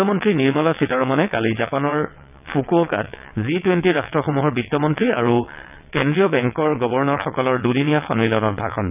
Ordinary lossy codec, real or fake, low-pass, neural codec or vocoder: none; fake; 3.6 kHz; vocoder, 22.05 kHz, 80 mel bands, WaveNeXt